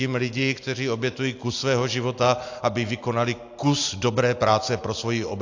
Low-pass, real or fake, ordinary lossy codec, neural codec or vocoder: 7.2 kHz; real; AAC, 48 kbps; none